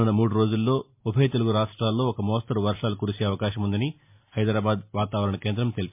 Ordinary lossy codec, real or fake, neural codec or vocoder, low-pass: MP3, 32 kbps; real; none; 3.6 kHz